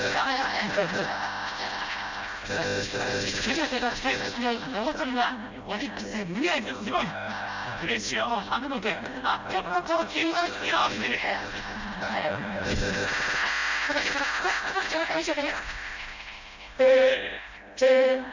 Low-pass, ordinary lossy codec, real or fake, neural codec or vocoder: 7.2 kHz; MP3, 64 kbps; fake; codec, 16 kHz, 0.5 kbps, FreqCodec, smaller model